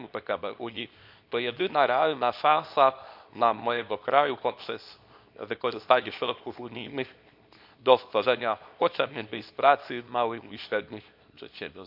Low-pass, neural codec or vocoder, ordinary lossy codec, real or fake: 5.4 kHz; codec, 24 kHz, 0.9 kbps, WavTokenizer, small release; none; fake